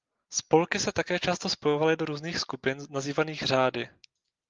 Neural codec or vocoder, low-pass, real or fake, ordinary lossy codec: none; 7.2 kHz; real; Opus, 32 kbps